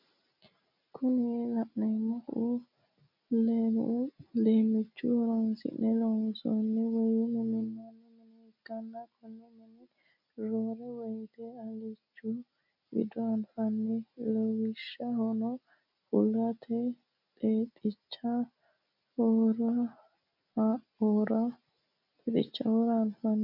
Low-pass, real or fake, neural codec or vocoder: 5.4 kHz; real; none